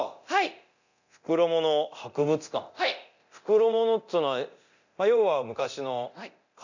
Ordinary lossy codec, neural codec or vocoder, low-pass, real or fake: none; codec, 24 kHz, 0.9 kbps, DualCodec; 7.2 kHz; fake